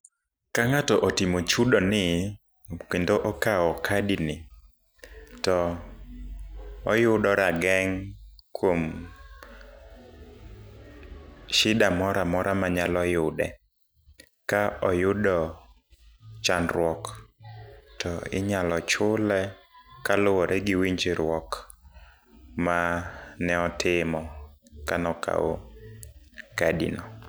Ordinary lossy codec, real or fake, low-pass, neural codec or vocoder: none; real; none; none